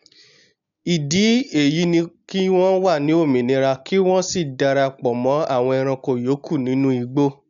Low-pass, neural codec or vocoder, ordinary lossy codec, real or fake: 7.2 kHz; none; none; real